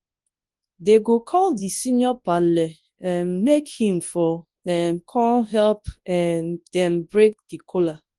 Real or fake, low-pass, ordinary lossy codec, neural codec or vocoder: fake; 10.8 kHz; Opus, 24 kbps; codec, 24 kHz, 0.9 kbps, WavTokenizer, large speech release